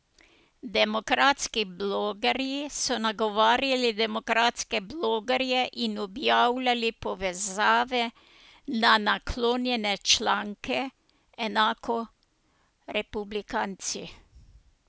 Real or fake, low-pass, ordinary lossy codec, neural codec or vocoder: real; none; none; none